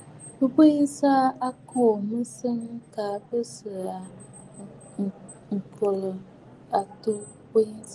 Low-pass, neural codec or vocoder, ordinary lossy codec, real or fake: 10.8 kHz; none; Opus, 24 kbps; real